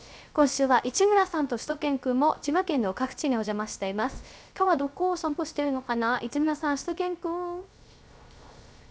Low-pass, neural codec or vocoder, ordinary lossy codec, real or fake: none; codec, 16 kHz, 0.3 kbps, FocalCodec; none; fake